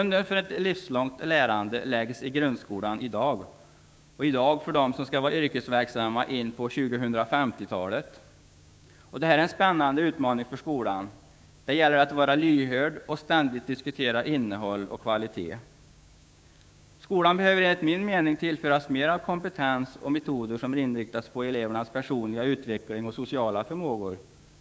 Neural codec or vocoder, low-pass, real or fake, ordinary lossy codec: codec, 16 kHz, 6 kbps, DAC; none; fake; none